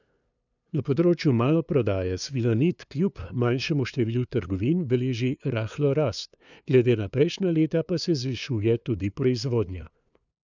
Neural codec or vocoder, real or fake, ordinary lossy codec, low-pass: codec, 16 kHz, 2 kbps, FunCodec, trained on LibriTTS, 25 frames a second; fake; none; 7.2 kHz